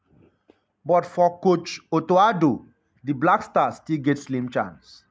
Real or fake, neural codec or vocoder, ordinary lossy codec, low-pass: real; none; none; none